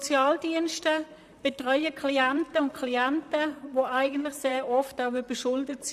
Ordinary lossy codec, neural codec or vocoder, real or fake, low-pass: none; vocoder, 44.1 kHz, 128 mel bands, Pupu-Vocoder; fake; 14.4 kHz